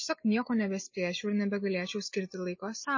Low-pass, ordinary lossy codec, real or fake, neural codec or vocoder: 7.2 kHz; MP3, 32 kbps; real; none